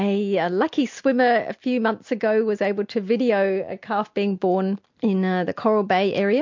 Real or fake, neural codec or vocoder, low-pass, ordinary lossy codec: real; none; 7.2 kHz; MP3, 48 kbps